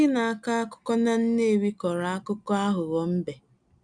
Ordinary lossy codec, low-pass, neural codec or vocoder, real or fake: none; 9.9 kHz; none; real